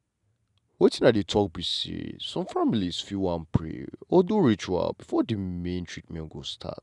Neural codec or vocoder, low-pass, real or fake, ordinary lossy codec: none; 10.8 kHz; real; none